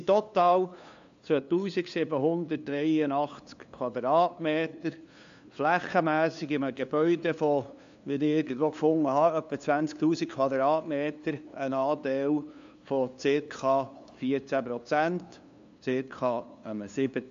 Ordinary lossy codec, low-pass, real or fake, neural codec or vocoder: AAC, 64 kbps; 7.2 kHz; fake; codec, 16 kHz, 2 kbps, FunCodec, trained on LibriTTS, 25 frames a second